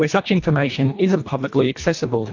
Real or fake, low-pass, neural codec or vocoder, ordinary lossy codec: fake; 7.2 kHz; codec, 24 kHz, 1.5 kbps, HILCodec; AAC, 48 kbps